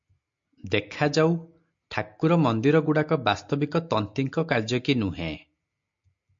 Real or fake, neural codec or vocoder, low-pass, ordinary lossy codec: real; none; 7.2 kHz; MP3, 48 kbps